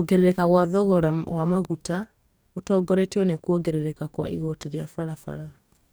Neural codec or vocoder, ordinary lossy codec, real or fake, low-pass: codec, 44.1 kHz, 2.6 kbps, DAC; none; fake; none